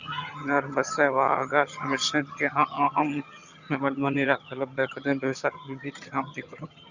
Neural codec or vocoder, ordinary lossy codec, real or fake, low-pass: vocoder, 22.05 kHz, 80 mel bands, HiFi-GAN; Opus, 64 kbps; fake; 7.2 kHz